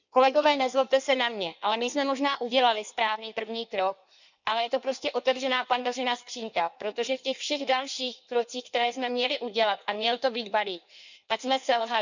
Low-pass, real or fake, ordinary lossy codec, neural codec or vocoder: 7.2 kHz; fake; none; codec, 16 kHz in and 24 kHz out, 1.1 kbps, FireRedTTS-2 codec